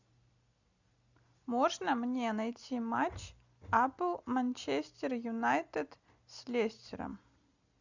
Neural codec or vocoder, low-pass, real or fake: none; 7.2 kHz; real